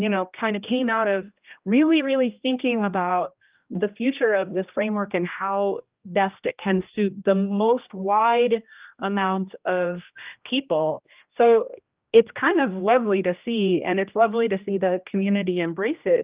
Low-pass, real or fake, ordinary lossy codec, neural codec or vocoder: 3.6 kHz; fake; Opus, 24 kbps; codec, 16 kHz, 1 kbps, X-Codec, HuBERT features, trained on general audio